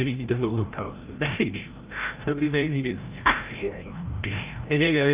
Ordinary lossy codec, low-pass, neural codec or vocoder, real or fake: Opus, 32 kbps; 3.6 kHz; codec, 16 kHz, 0.5 kbps, FreqCodec, larger model; fake